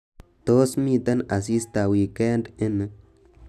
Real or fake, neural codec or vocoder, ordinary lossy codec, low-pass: real; none; none; 14.4 kHz